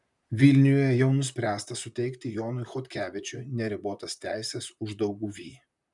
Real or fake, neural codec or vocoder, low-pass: fake; vocoder, 44.1 kHz, 128 mel bands, Pupu-Vocoder; 10.8 kHz